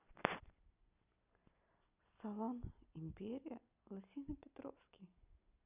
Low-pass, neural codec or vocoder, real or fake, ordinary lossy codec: 3.6 kHz; none; real; none